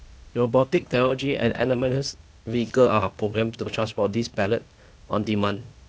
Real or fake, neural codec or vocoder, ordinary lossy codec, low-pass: fake; codec, 16 kHz, 0.8 kbps, ZipCodec; none; none